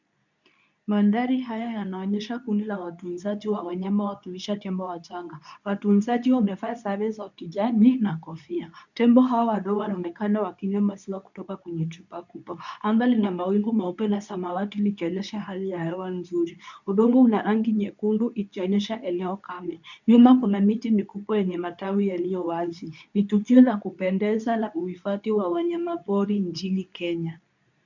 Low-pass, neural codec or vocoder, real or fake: 7.2 kHz; codec, 24 kHz, 0.9 kbps, WavTokenizer, medium speech release version 2; fake